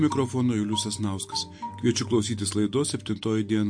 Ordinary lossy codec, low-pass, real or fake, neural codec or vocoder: MP3, 48 kbps; 9.9 kHz; real; none